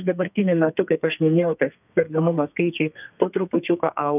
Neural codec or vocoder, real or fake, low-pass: codec, 32 kHz, 1.9 kbps, SNAC; fake; 3.6 kHz